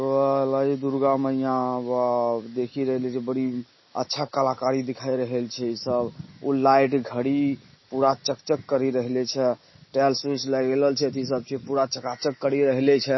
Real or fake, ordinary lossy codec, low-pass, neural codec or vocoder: real; MP3, 24 kbps; 7.2 kHz; none